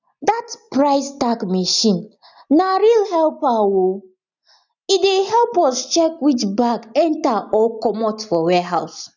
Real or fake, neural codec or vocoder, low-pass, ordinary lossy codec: real; none; 7.2 kHz; none